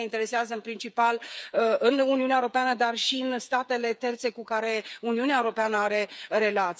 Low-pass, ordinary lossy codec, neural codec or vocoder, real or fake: none; none; codec, 16 kHz, 8 kbps, FreqCodec, smaller model; fake